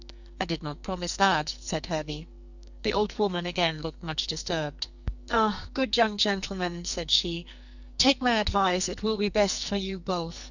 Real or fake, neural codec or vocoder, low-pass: fake; codec, 44.1 kHz, 2.6 kbps, SNAC; 7.2 kHz